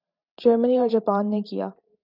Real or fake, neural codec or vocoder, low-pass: fake; vocoder, 44.1 kHz, 128 mel bands every 512 samples, BigVGAN v2; 5.4 kHz